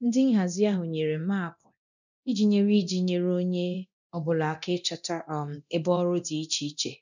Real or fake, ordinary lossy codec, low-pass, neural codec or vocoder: fake; none; 7.2 kHz; codec, 24 kHz, 0.9 kbps, DualCodec